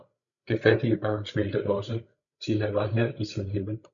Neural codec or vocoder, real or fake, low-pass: codec, 16 kHz, 16 kbps, FreqCodec, larger model; fake; 7.2 kHz